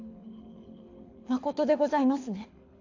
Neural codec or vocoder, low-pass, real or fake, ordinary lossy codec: codec, 24 kHz, 6 kbps, HILCodec; 7.2 kHz; fake; AAC, 48 kbps